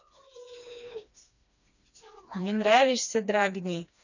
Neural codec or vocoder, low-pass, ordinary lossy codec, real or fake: codec, 16 kHz, 2 kbps, FreqCodec, smaller model; 7.2 kHz; none; fake